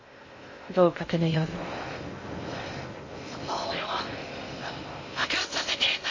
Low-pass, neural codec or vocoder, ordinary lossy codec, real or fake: 7.2 kHz; codec, 16 kHz in and 24 kHz out, 0.6 kbps, FocalCodec, streaming, 2048 codes; MP3, 32 kbps; fake